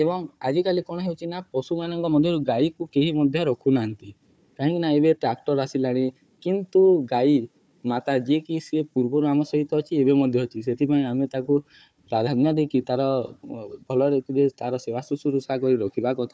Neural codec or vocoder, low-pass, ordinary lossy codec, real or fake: codec, 16 kHz, 4 kbps, FunCodec, trained on Chinese and English, 50 frames a second; none; none; fake